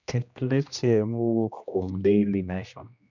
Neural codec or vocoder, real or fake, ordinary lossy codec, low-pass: codec, 16 kHz, 1 kbps, X-Codec, HuBERT features, trained on general audio; fake; none; 7.2 kHz